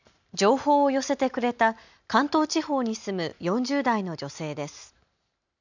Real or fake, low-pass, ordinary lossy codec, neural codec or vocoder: real; 7.2 kHz; none; none